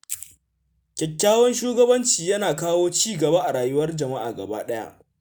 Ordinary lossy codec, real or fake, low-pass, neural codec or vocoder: none; real; none; none